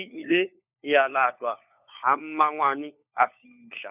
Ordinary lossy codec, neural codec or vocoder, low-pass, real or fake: none; codec, 16 kHz, 4 kbps, FunCodec, trained on LibriTTS, 50 frames a second; 3.6 kHz; fake